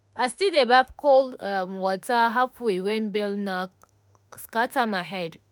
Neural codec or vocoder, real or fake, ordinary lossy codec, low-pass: autoencoder, 48 kHz, 32 numbers a frame, DAC-VAE, trained on Japanese speech; fake; none; none